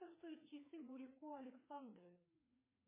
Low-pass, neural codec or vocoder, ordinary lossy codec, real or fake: 3.6 kHz; codec, 16 kHz, 2 kbps, FreqCodec, larger model; MP3, 16 kbps; fake